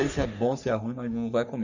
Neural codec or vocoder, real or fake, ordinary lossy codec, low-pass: codec, 44.1 kHz, 3.4 kbps, Pupu-Codec; fake; none; 7.2 kHz